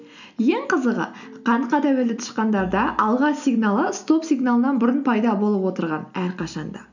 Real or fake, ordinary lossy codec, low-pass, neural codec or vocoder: real; none; 7.2 kHz; none